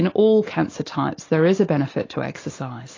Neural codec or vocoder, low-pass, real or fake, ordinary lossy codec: none; 7.2 kHz; real; AAC, 32 kbps